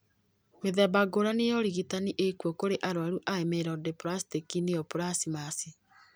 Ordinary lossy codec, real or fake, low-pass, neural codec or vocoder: none; real; none; none